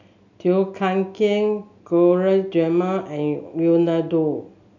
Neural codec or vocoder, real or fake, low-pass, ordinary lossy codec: none; real; 7.2 kHz; none